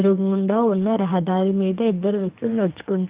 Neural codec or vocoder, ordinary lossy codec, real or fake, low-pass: codec, 44.1 kHz, 2.6 kbps, SNAC; Opus, 32 kbps; fake; 3.6 kHz